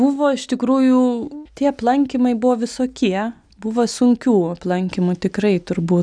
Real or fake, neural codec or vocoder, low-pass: real; none; 9.9 kHz